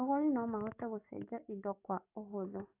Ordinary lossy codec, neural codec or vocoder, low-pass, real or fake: AAC, 32 kbps; codec, 44.1 kHz, 7.8 kbps, DAC; 3.6 kHz; fake